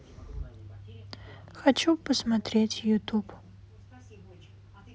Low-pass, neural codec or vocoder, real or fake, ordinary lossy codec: none; none; real; none